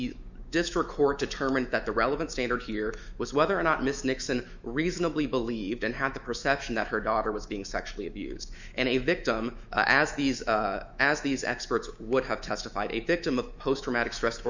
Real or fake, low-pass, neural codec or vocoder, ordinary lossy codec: fake; 7.2 kHz; autoencoder, 48 kHz, 128 numbers a frame, DAC-VAE, trained on Japanese speech; Opus, 64 kbps